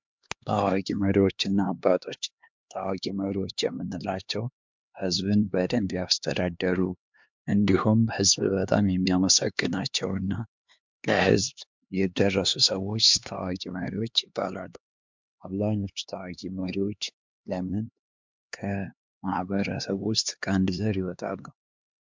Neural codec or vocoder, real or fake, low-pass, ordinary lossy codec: codec, 16 kHz, 2 kbps, X-Codec, HuBERT features, trained on LibriSpeech; fake; 7.2 kHz; MP3, 64 kbps